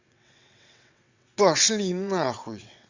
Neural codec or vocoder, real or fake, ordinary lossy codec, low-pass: vocoder, 22.05 kHz, 80 mel bands, WaveNeXt; fake; Opus, 64 kbps; 7.2 kHz